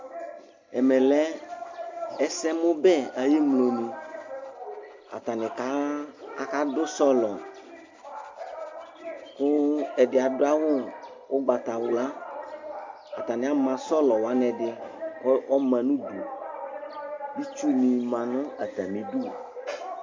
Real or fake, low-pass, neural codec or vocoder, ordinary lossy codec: real; 7.2 kHz; none; MP3, 64 kbps